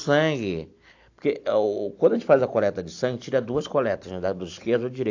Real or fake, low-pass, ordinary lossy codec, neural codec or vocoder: real; 7.2 kHz; AAC, 48 kbps; none